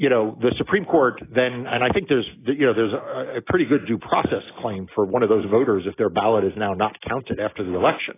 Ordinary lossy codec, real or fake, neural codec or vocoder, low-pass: AAC, 16 kbps; real; none; 3.6 kHz